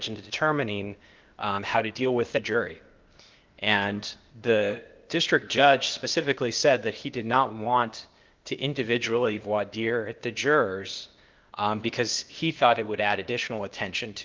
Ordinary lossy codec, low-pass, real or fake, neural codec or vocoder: Opus, 32 kbps; 7.2 kHz; fake; codec, 16 kHz, 0.8 kbps, ZipCodec